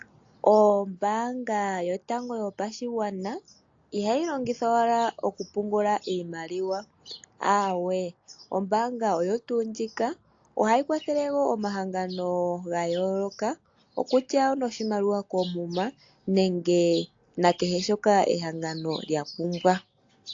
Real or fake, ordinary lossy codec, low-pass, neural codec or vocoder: real; AAC, 48 kbps; 7.2 kHz; none